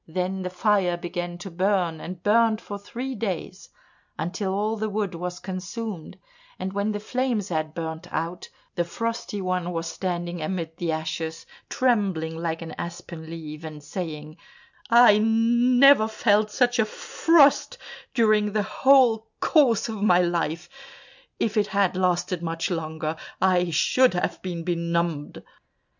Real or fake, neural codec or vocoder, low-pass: real; none; 7.2 kHz